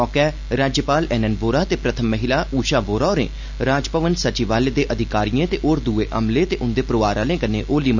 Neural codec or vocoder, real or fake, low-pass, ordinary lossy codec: none; real; 7.2 kHz; none